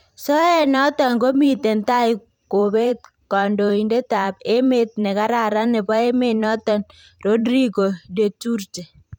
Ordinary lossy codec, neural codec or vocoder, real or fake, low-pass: none; vocoder, 44.1 kHz, 128 mel bands every 512 samples, BigVGAN v2; fake; 19.8 kHz